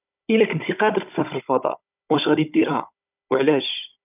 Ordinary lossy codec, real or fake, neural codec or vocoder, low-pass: none; fake; codec, 16 kHz, 16 kbps, FunCodec, trained on Chinese and English, 50 frames a second; 3.6 kHz